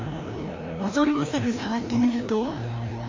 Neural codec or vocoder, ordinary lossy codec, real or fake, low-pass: codec, 16 kHz, 1 kbps, FreqCodec, larger model; MP3, 48 kbps; fake; 7.2 kHz